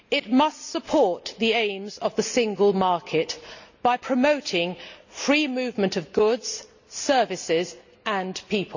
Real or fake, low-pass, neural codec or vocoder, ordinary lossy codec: real; 7.2 kHz; none; none